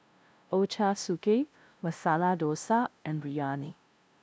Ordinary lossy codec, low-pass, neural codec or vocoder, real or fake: none; none; codec, 16 kHz, 0.5 kbps, FunCodec, trained on LibriTTS, 25 frames a second; fake